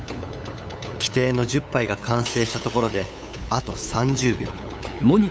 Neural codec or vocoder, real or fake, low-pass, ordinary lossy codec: codec, 16 kHz, 8 kbps, FunCodec, trained on LibriTTS, 25 frames a second; fake; none; none